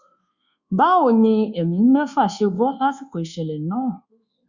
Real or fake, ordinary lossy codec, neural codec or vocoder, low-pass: fake; Opus, 64 kbps; codec, 24 kHz, 1.2 kbps, DualCodec; 7.2 kHz